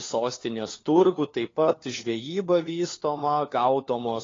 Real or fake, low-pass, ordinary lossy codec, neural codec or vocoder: fake; 7.2 kHz; AAC, 32 kbps; codec, 16 kHz, 4 kbps, FunCodec, trained on LibriTTS, 50 frames a second